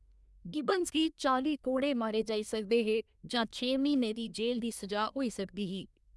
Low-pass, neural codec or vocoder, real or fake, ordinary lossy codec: none; codec, 24 kHz, 1 kbps, SNAC; fake; none